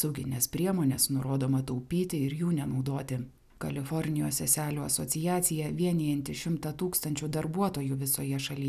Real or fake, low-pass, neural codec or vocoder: real; 14.4 kHz; none